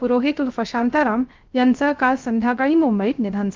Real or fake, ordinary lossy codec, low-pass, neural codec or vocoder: fake; Opus, 24 kbps; 7.2 kHz; codec, 16 kHz, 0.3 kbps, FocalCodec